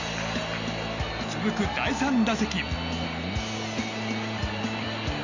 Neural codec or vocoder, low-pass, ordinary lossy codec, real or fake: none; 7.2 kHz; none; real